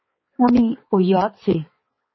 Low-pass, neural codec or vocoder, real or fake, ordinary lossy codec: 7.2 kHz; codec, 16 kHz, 4 kbps, X-Codec, HuBERT features, trained on balanced general audio; fake; MP3, 24 kbps